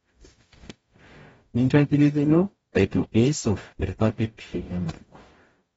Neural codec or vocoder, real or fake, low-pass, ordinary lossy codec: codec, 44.1 kHz, 0.9 kbps, DAC; fake; 19.8 kHz; AAC, 24 kbps